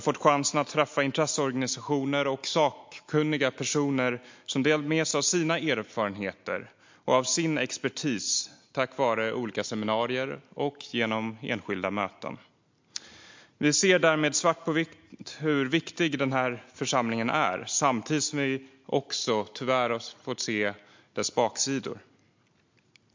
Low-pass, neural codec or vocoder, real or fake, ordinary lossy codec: 7.2 kHz; none; real; MP3, 48 kbps